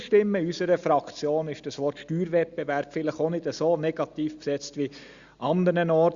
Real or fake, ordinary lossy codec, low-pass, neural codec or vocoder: real; Opus, 64 kbps; 7.2 kHz; none